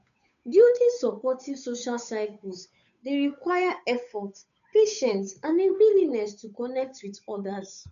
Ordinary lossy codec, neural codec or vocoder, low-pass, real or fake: none; codec, 16 kHz, 8 kbps, FunCodec, trained on Chinese and English, 25 frames a second; 7.2 kHz; fake